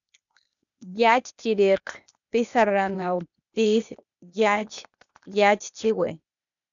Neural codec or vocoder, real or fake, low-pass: codec, 16 kHz, 0.8 kbps, ZipCodec; fake; 7.2 kHz